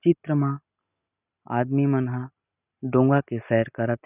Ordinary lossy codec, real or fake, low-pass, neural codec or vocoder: none; real; 3.6 kHz; none